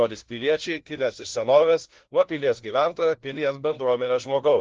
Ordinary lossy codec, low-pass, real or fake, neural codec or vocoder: Opus, 16 kbps; 7.2 kHz; fake; codec, 16 kHz, 1 kbps, FunCodec, trained on LibriTTS, 50 frames a second